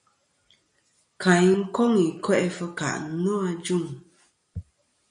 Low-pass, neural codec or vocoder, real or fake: 9.9 kHz; none; real